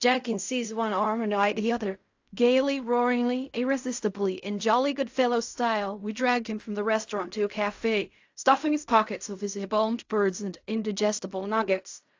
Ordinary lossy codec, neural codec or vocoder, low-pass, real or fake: AAC, 48 kbps; codec, 16 kHz in and 24 kHz out, 0.4 kbps, LongCat-Audio-Codec, fine tuned four codebook decoder; 7.2 kHz; fake